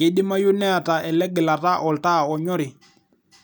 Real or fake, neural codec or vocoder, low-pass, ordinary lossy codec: real; none; none; none